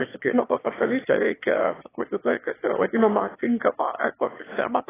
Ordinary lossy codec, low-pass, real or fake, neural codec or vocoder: AAC, 16 kbps; 3.6 kHz; fake; autoencoder, 22.05 kHz, a latent of 192 numbers a frame, VITS, trained on one speaker